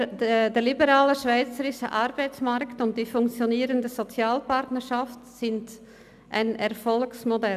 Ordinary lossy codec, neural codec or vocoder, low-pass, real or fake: none; none; 14.4 kHz; real